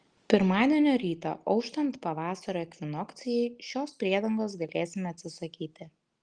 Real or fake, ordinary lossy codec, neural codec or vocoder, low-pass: real; Opus, 24 kbps; none; 9.9 kHz